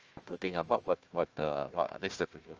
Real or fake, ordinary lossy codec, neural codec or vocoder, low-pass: fake; Opus, 24 kbps; codec, 16 kHz, 1 kbps, FunCodec, trained on Chinese and English, 50 frames a second; 7.2 kHz